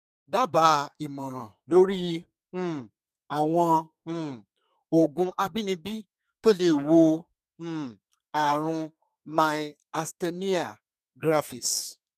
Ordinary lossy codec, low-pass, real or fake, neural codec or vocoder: none; 14.4 kHz; fake; codec, 32 kHz, 1.9 kbps, SNAC